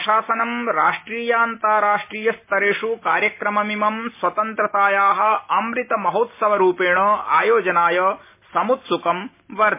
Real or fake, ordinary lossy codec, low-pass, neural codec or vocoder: real; MP3, 24 kbps; 3.6 kHz; none